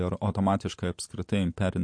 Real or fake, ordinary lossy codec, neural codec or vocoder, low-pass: real; MP3, 48 kbps; none; 9.9 kHz